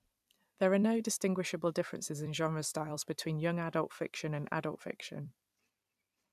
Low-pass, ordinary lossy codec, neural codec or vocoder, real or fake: 14.4 kHz; none; vocoder, 44.1 kHz, 128 mel bands every 256 samples, BigVGAN v2; fake